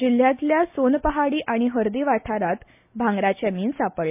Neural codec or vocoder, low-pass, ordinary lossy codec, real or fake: none; 3.6 kHz; MP3, 32 kbps; real